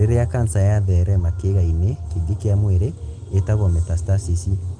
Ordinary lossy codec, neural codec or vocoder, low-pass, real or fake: Opus, 24 kbps; none; 9.9 kHz; real